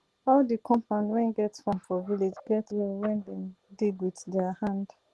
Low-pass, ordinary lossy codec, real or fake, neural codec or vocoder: 10.8 kHz; Opus, 16 kbps; real; none